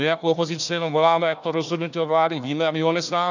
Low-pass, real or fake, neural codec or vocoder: 7.2 kHz; fake; codec, 16 kHz, 1 kbps, FunCodec, trained on Chinese and English, 50 frames a second